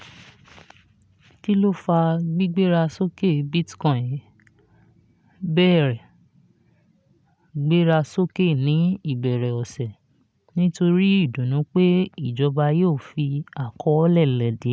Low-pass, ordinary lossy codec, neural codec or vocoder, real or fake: none; none; none; real